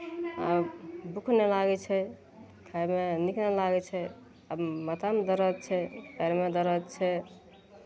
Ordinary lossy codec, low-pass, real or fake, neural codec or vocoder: none; none; real; none